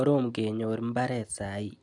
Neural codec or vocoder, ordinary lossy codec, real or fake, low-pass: none; none; real; 10.8 kHz